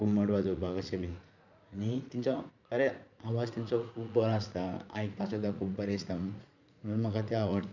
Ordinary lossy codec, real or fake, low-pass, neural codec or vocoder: none; fake; 7.2 kHz; vocoder, 44.1 kHz, 80 mel bands, Vocos